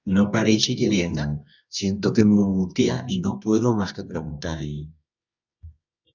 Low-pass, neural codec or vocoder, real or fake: 7.2 kHz; codec, 24 kHz, 0.9 kbps, WavTokenizer, medium music audio release; fake